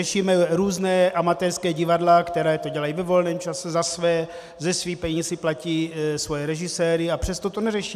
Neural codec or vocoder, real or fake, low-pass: none; real; 14.4 kHz